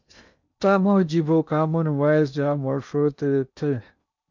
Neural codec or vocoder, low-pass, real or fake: codec, 16 kHz in and 24 kHz out, 0.8 kbps, FocalCodec, streaming, 65536 codes; 7.2 kHz; fake